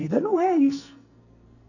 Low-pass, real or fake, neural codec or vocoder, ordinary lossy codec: 7.2 kHz; fake; codec, 44.1 kHz, 2.6 kbps, SNAC; none